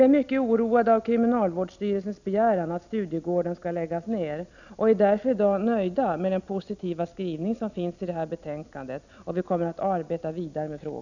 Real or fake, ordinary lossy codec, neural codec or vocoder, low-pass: real; none; none; 7.2 kHz